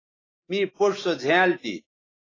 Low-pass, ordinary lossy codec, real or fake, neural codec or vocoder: 7.2 kHz; AAC, 32 kbps; real; none